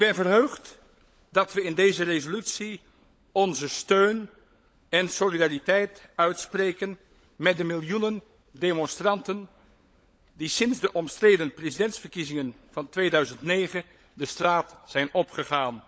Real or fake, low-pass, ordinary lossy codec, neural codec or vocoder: fake; none; none; codec, 16 kHz, 16 kbps, FunCodec, trained on LibriTTS, 50 frames a second